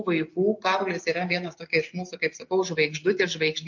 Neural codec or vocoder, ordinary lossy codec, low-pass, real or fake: none; MP3, 48 kbps; 7.2 kHz; real